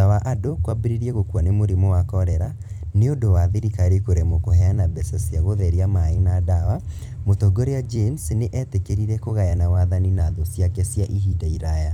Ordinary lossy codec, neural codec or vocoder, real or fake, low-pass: none; vocoder, 44.1 kHz, 128 mel bands every 256 samples, BigVGAN v2; fake; 19.8 kHz